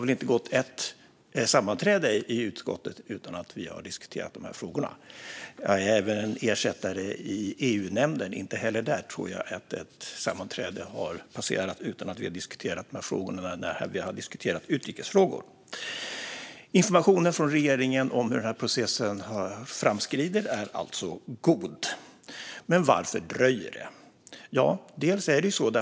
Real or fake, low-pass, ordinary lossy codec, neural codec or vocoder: real; none; none; none